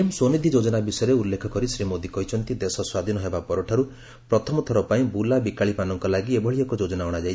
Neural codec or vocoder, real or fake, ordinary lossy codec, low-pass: none; real; none; none